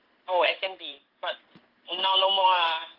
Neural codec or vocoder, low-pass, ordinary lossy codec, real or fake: codec, 16 kHz in and 24 kHz out, 1 kbps, XY-Tokenizer; 5.4 kHz; Opus, 16 kbps; fake